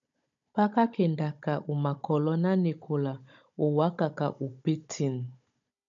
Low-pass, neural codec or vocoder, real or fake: 7.2 kHz; codec, 16 kHz, 16 kbps, FunCodec, trained on Chinese and English, 50 frames a second; fake